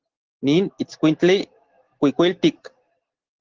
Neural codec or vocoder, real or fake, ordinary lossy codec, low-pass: none; real; Opus, 16 kbps; 7.2 kHz